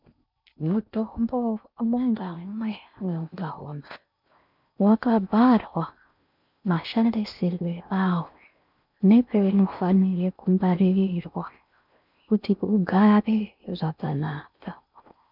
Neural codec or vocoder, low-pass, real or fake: codec, 16 kHz in and 24 kHz out, 0.6 kbps, FocalCodec, streaming, 4096 codes; 5.4 kHz; fake